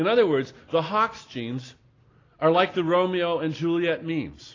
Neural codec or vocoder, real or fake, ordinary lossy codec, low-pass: none; real; AAC, 32 kbps; 7.2 kHz